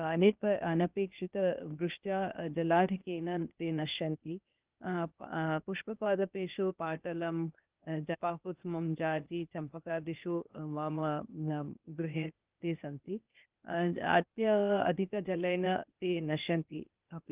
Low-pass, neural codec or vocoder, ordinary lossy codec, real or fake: 3.6 kHz; codec, 16 kHz, 0.8 kbps, ZipCodec; Opus, 32 kbps; fake